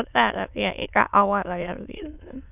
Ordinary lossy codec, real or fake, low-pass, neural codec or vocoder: none; fake; 3.6 kHz; autoencoder, 22.05 kHz, a latent of 192 numbers a frame, VITS, trained on many speakers